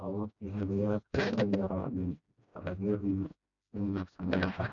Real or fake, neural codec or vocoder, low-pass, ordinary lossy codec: fake; codec, 16 kHz, 1 kbps, FreqCodec, smaller model; 7.2 kHz; none